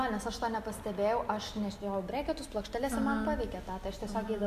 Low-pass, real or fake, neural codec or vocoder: 14.4 kHz; real; none